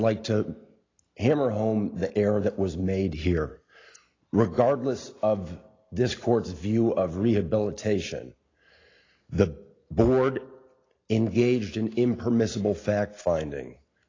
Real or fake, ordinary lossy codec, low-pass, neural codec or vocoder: real; AAC, 32 kbps; 7.2 kHz; none